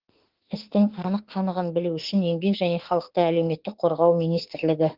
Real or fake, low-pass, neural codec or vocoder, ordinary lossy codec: fake; 5.4 kHz; autoencoder, 48 kHz, 32 numbers a frame, DAC-VAE, trained on Japanese speech; Opus, 16 kbps